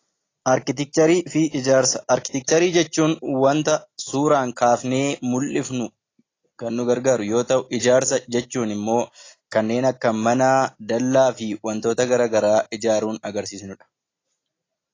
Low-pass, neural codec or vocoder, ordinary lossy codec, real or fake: 7.2 kHz; none; AAC, 32 kbps; real